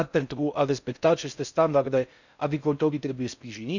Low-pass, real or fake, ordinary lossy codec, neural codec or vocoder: 7.2 kHz; fake; none; codec, 16 kHz in and 24 kHz out, 0.6 kbps, FocalCodec, streaming, 4096 codes